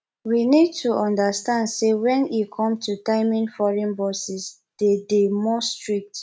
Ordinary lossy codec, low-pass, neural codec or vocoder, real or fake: none; none; none; real